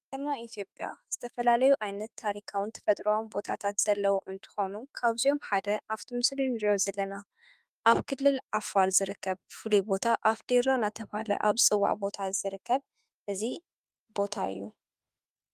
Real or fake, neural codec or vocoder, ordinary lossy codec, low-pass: fake; autoencoder, 48 kHz, 32 numbers a frame, DAC-VAE, trained on Japanese speech; Opus, 32 kbps; 14.4 kHz